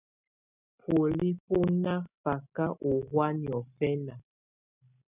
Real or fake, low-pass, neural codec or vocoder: real; 3.6 kHz; none